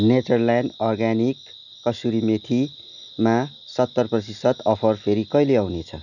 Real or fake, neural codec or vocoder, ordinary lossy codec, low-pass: real; none; none; 7.2 kHz